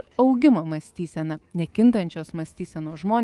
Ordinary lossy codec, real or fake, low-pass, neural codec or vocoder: Opus, 24 kbps; fake; 10.8 kHz; codec, 24 kHz, 3.1 kbps, DualCodec